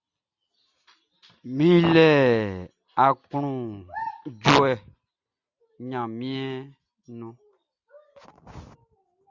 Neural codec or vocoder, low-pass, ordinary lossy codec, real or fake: none; 7.2 kHz; Opus, 64 kbps; real